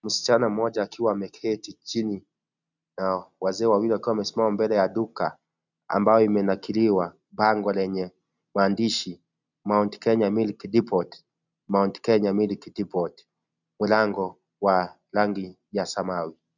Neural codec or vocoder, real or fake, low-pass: none; real; 7.2 kHz